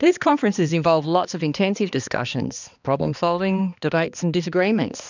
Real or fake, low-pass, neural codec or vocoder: fake; 7.2 kHz; codec, 16 kHz, 2 kbps, X-Codec, HuBERT features, trained on balanced general audio